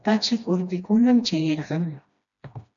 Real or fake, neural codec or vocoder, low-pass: fake; codec, 16 kHz, 1 kbps, FreqCodec, smaller model; 7.2 kHz